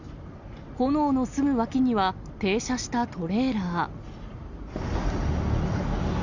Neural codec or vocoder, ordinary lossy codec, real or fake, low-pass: none; none; real; 7.2 kHz